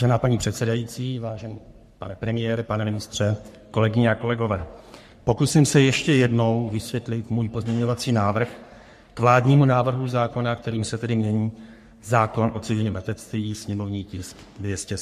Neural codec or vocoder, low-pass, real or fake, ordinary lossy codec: codec, 44.1 kHz, 3.4 kbps, Pupu-Codec; 14.4 kHz; fake; MP3, 64 kbps